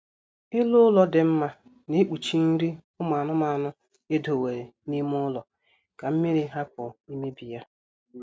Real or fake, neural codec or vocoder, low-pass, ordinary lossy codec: real; none; none; none